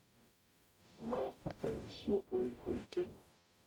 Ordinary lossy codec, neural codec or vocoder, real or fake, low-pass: none; codec, 44.1 kHz, 0.9 kbps, DAC; fake; 19.8 kHz